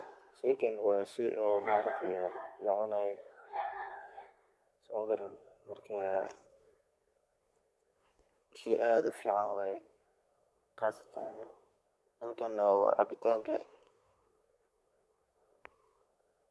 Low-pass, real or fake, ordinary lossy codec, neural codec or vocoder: none; fake; none; codec, 24 kHz, 1 kbps, SNAC